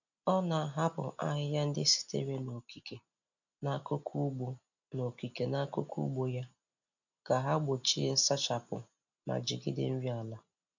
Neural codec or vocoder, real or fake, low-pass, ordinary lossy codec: none; real; 7.2 kHz; none